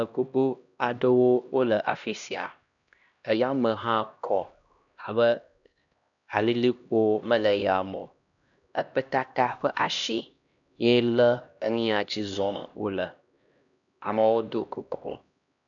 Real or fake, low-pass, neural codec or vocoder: fake; 7.2 kHz; codec, 16 kHz, 1 kbps, X-Codec, HuBERT features, trained on LibriSpeech